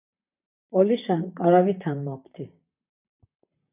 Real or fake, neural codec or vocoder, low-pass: fake; vocoder, 44.1 kHz, 128 mel bands, Pupu-Vocoder; 3.6 kHz